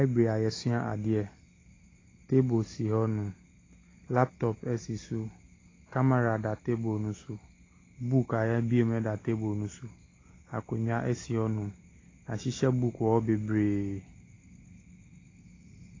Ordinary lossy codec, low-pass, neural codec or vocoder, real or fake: AAC, 32 kbps; 7.2 kHz; none; real